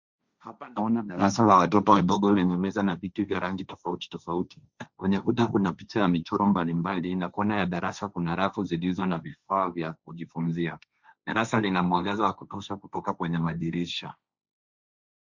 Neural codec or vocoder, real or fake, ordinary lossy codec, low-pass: codec, 16 kHz, 1.1 kbps, Voila-Tokenizer; fake; Opus, 64 kbps; 7.2 kHz